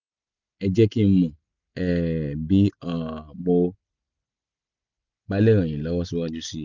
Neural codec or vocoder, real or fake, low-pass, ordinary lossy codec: none; real; 7.2 kHz; none